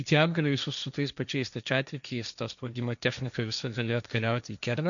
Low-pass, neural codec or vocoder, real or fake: 7.2 kHz; codec, 16 kHz, 1.1 kbps, Voila-Tokenizer; fake